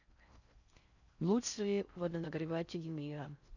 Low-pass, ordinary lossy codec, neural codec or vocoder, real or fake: 7.2 kHz; none; codec, 16 kHz in and 24 kHz out, 0.6 kbps, FocalCodec, streaming, 4096 codes; fake